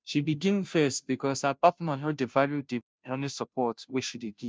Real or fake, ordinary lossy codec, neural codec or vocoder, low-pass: fake; none; codec, 16 kHz, 0.5 kbps, FunCodec, trained on Chinese and English, 25 frames a second; none